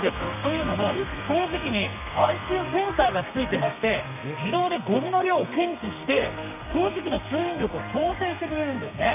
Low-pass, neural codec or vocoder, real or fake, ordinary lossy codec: 3.6 kHz; codec, 32 kHz, 1.9 kbps, SNAC; fake; none